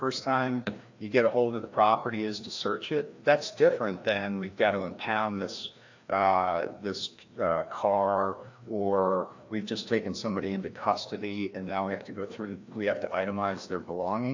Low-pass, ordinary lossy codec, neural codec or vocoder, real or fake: 7.2 kHz; AAC, 48 kbps; codec, 16 kHz, 1 kbps, FreqCodec, larger model; fake